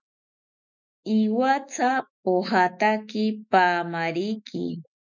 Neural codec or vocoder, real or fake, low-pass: autoencoder, 48 kHz, 128 numbers a frame, DAC-VAE, trained on Japanese speech; fake; 7.2 kHz